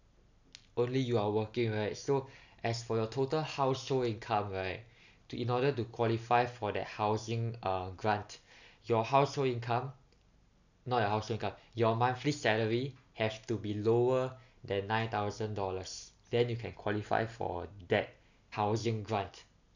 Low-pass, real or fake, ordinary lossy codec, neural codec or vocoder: 7.2 kHz; real; none; none